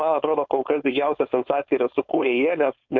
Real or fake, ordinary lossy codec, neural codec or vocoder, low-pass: fake; MP3, 48 kbps; codec, 16 kHz, 4.8 kbps, FACodec; 7.2 kHz